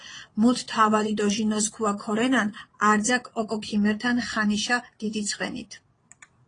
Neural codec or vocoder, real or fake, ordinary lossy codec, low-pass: vocoder, 22.05 kHz, 80 mel bands, Vocos; fake; AAC, 32 kbps; 9.9 kHz